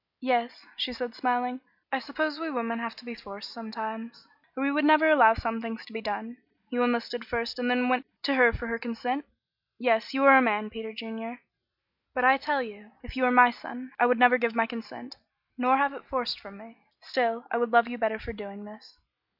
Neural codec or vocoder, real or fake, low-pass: none; real; 5.4 kHz